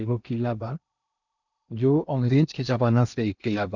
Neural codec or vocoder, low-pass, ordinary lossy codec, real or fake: codec, 16 kHz in and 24 kHz out, 0.8 kbps, FocalCodec, streaming, 65536 codes; 7.2 kHz; none; fake